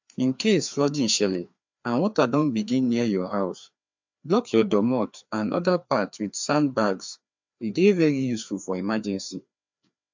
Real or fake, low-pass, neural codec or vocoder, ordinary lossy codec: fake; 7.2 kHz; codec, 16 kHz, 2 kbps, FreqCodec, larger model; MP3, 64 kbps